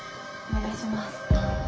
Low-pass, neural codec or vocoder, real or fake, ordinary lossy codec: none; none; real; none